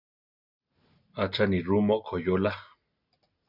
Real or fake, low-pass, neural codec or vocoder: real; 5.4 kHz; none